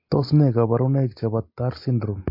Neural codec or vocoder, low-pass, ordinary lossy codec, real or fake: none; 5.4 kHz; MP3, 32 kbps; real